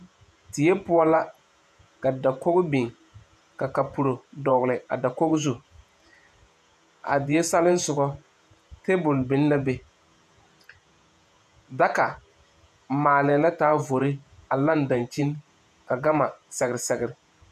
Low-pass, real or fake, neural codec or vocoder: 14.4 kHz; fake; vocoder, 48 kHz, 128 mel bands, Vocos